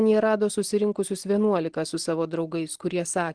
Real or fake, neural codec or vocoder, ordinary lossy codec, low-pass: real; none; Opus, 16 kbps; 9.9 kHz